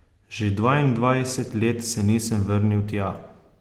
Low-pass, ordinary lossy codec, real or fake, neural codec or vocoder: 19.8 kHz; Opus, 16 kbps; real; none